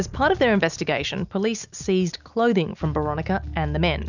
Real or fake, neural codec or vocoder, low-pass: real; none; 7.2 kHz